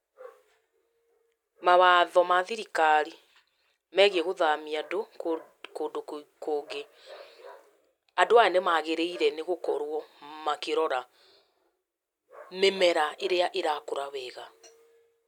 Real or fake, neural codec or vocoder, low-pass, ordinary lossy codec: real; none; 19.8 kHz; none